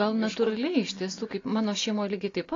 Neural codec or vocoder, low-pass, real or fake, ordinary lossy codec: none; 7.2 kHz; real; AAC, 32 kbps